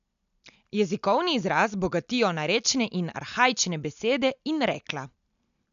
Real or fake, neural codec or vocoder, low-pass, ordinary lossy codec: real; none; 7.2 kHz; none